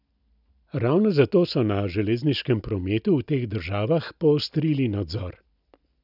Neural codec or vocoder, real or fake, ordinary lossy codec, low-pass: none; real; none; 5.4 kHz